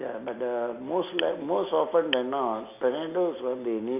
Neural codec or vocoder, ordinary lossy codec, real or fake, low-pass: none; none; real; 3.6 kHz